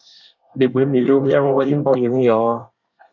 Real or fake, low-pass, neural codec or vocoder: fake; 7.2 kHz; codec, 24 kHz, 1 kbps, SNAC